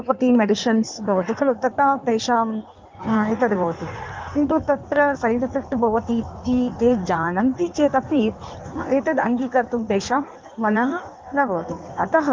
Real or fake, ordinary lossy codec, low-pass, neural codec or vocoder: fake; Opus, 24 kbps; 7.2 kHz; codec, 16 kHz in and 24 kHz out, 1.1 kbps, FireRedTTS-2 codec